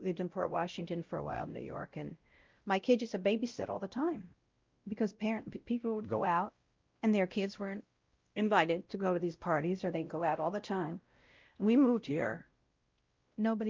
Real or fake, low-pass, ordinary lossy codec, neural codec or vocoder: fake; 7.2 kHz; Opus, 24 kbps; codec, 16 kHz, 0.5 kbps, X-Codec, WavLM features, trained on Multilingual LibriSpeech